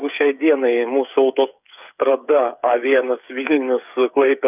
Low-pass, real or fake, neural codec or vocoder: 3.6 kHz; fake; codec, 16 kHz, 8 kbps, FreqCodec, smaller model